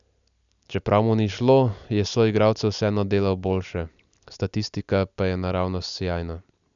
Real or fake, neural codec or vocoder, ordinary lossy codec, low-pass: real; none; none; 7.2 kHz